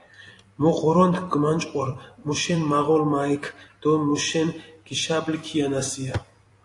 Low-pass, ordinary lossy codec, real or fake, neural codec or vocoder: 10.8 kHz; AAC, 48 kbps; fake; vocoder, 44.1 kHz, 128 mel bands every 256 samples, BigVGAN v2